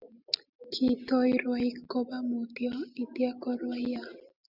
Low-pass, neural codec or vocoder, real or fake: 5.4 kHz; none; real